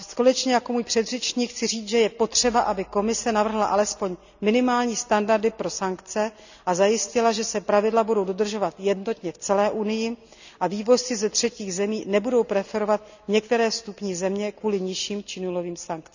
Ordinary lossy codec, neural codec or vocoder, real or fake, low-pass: none; none; real; 7.2 kHz